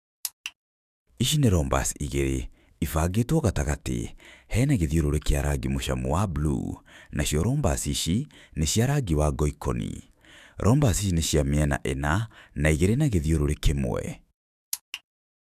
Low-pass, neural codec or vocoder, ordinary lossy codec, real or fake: 14.4 kHz; autoencoder, 48 kHz, 128 numbers a frame, DAC-VAE, trained on Japanese speech; none; fake